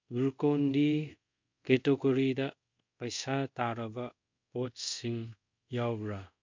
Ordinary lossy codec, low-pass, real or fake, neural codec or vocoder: MP3, 64 kbps; 7.2 kHz; fake; codec, 24 kHz, 0.5 kbps, DualCodec